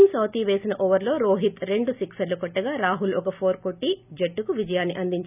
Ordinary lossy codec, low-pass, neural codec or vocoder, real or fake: none; 3.6 kHz; none; real